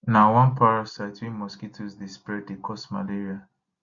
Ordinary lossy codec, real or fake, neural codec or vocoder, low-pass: Opus, 64 kbps; real; none; 7.2 kHz